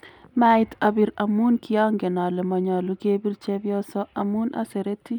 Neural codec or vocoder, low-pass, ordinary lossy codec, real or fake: none; 19.8 kHz; none; real